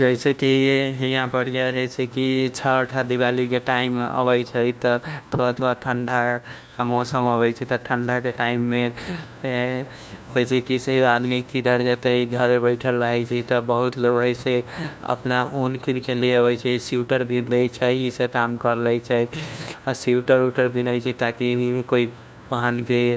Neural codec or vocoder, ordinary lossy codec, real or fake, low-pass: codec, 16 kHz, 1 kbps, FunCodec, trained on LibriTTS, 50 frames a second; none; fake; none